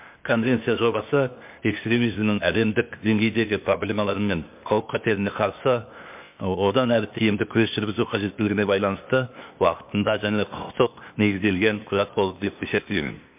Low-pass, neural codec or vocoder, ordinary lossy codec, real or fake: 3.6 kHz; codec, 16 kHz, 0.8 kbps, ZipCodec; MP3, 32 kbps; fake